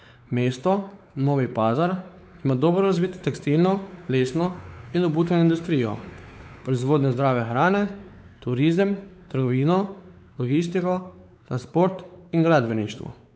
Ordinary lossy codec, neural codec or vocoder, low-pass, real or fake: none; codec, 16 kHz, 4 kbps, X-Codec, WavLM features, trained on Multilingual LibriSpeech; none; fake